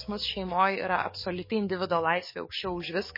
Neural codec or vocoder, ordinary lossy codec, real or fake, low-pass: codec, 44.1 kHz, 7.8 kbps, DAC; MP3, 24 kbps; fake; 5.4 kHz